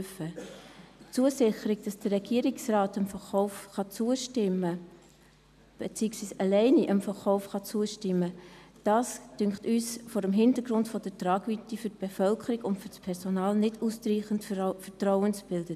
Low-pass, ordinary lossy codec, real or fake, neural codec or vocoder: 14.4 kHz; none; real; none